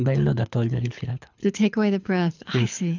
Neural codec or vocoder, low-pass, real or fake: codec, 24 kHz, 6 kbps, HILCodec; 7.2 kHz; fake